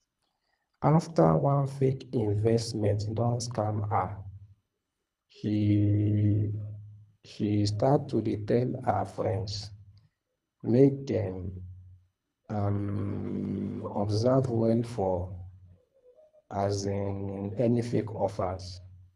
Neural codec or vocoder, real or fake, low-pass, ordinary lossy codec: codec, 24 kHz, 3 kbps, HILCodec; fake; none; none